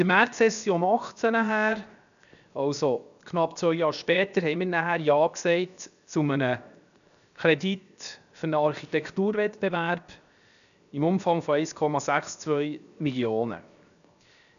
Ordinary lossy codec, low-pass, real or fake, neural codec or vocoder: none; 7.2 kHz; fake; codec, 16 kHz, 0.7 kbps, FocalCodec